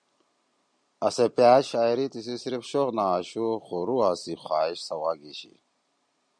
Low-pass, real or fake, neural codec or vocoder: 9.9 kHz; real; none